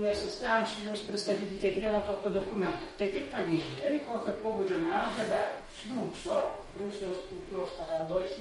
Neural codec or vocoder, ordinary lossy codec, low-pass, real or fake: codec, 44.1 kHz, 2.6 kbps, DAC; MP3, 48 kbps; 19.8 kHz; fake